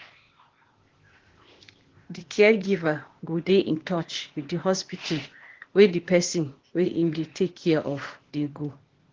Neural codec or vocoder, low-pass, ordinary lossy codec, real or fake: codec, 16 kHz, 0.8 kbps, ZipCodec; 7.2 kHz; Opus, 16 kbps; fake